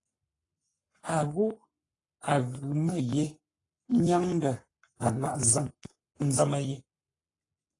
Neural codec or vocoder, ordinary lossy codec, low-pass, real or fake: codec, 44.1 kHz, 7.8 kbps, Pupu-Codec; AAC, 32 kbps; 10.8 kHz; fake